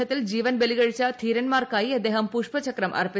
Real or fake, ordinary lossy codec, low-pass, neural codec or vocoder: real; none; none; none